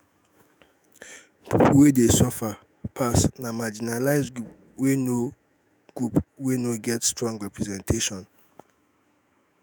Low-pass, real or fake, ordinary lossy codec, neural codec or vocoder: none; fake; none; autoencoder, 48 kHz, 128 numbers a frame, DAC-VAE, trained on Japanese speech